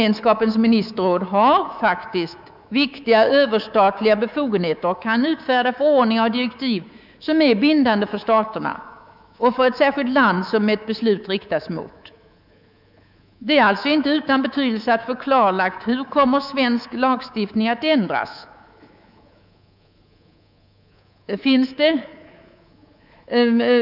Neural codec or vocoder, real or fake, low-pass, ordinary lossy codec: codec, 24 kHz, 3.1 kbps, DualCodec; fake; 5.4 kHz; none